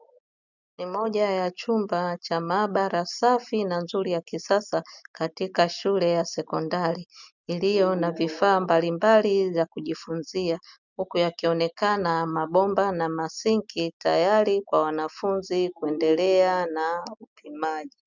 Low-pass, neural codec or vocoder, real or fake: 7.2 kHz; none; real